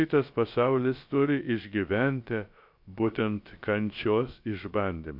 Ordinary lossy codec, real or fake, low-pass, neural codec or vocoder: MP3, 48 kbps; fake; 5.4 kHz; codec, 16 kHz, about 1 kbps, DyCAST, with the encoder's durations